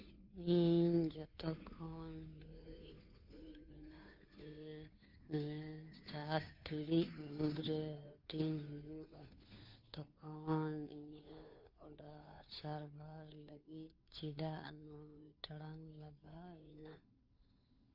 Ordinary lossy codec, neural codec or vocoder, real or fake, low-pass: Opus, 64 kbps; codec, 16 kHz, 2 kbps, FunCodec, trained on Chinese and English, 25 frames a second; fake; 5.4 kHz